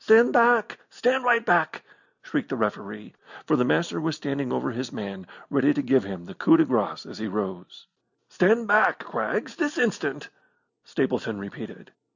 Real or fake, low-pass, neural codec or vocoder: real; 7.2 kHz; none